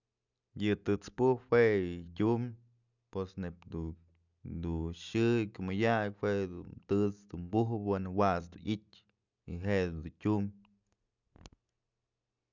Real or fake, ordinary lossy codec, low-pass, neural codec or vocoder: real; none; 7.2 kHz; none